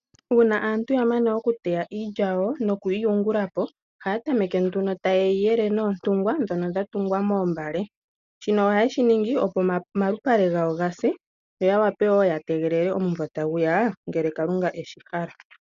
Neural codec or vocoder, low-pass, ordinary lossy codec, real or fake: none; 7.2 kHz; AAC, 96 kbps; real